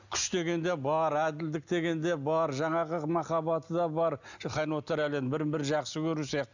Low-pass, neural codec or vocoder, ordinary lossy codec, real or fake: 7.2 kHz; none; none; real